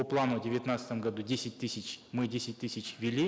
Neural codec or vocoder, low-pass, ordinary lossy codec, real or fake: none; none; none; real